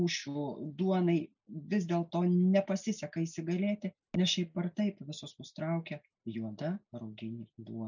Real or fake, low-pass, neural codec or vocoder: real; 7.2 kHz; none